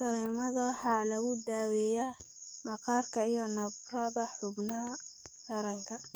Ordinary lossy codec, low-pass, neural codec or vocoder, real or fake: none; none; codec, 44.1 kHz, 7.8 kbps, DAC; fake